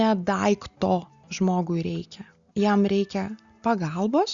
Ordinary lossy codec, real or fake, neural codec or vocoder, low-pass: Opus, 64 kbps; real; none; 7.2 kHz